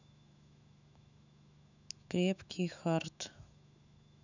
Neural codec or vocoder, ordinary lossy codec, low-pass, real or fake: autoencoder, 48 kHz, 128 numbers a frame, DAC-VAE, trained on Japanese speech; none; 7.2 kHz; fake